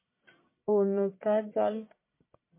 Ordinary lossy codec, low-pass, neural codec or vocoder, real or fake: MP3, 24 kbps; 3.6 kHz; codec, 44.1 kHz, 1.7 kbps, Pupu-Codec; fake